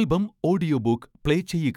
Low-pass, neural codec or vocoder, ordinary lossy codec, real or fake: 19.8 kHz; autoencoder, 48 kHz, 128 numbers a frame, DAC-VAE, trained on Japanese speech; none; fake